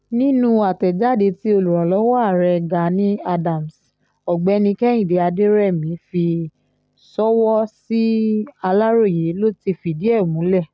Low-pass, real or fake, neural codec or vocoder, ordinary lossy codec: none; real; none; none